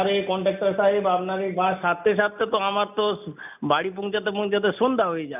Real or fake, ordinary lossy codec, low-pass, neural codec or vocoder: real; none; 3.6 kHz; none